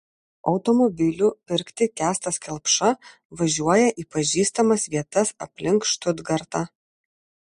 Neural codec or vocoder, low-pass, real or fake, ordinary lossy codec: none; 14.4 kHz; real; MP3, 48 kbps